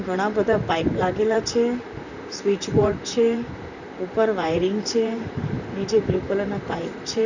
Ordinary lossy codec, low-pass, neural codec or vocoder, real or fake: none; 7.2 kHz; vocoder, 44.1 kHz, 128 mel bands, Pupu-Vocoder; fake